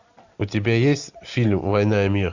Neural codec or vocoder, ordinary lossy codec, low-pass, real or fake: none; Opus, 64 kbps; 7.2 kHz; real